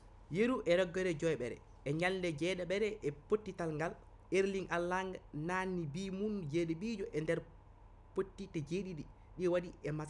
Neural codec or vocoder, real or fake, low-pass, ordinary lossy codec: none; real; 10.8 kHz; none